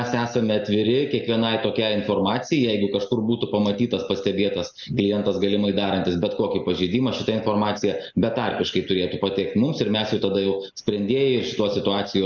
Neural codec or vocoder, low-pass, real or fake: none; 7.2 kHz; real